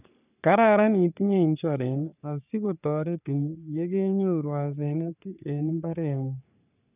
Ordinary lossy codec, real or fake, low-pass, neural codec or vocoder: none; fake; 3.6 kHz; codec, 44.1 kHz, 3.4 kbps, Pupu-Codec